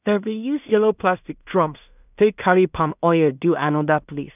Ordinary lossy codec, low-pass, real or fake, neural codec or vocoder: none; 3.6 kHz; fake; codec, 16 kHz in and 24 kHz out, 0.4 kbps, LongCat-Audio-Codec, two codebook decoder